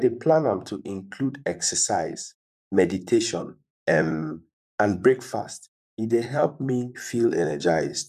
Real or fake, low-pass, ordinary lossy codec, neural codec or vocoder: fake; 14.4 kHz; none; vocoder, 44.1 kHz, 128 mel bands, Pupu-Vocoder